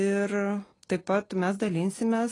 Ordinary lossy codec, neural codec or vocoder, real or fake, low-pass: AAC, 32 kbps; none; real; 10.8 kHz